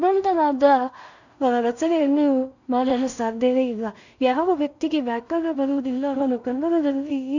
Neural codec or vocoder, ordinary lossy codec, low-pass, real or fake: codec, 16 kHz in and 24 kHz out, 0.4 kbps, LongCat-Audio-Codec, two codebook decoder; none; 7.2 kHz; fake